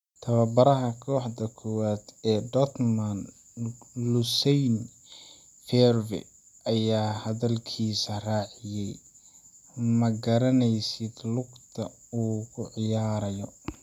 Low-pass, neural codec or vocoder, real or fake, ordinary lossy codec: 19.8 kHz; none; real; none